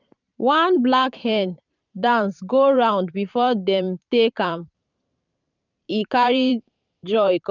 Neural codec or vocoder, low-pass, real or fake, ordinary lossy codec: vocoder, 44.1 kHz, 128 mel bands every 512 samples, BigVGAN v2; 7.2 kHz; fake; none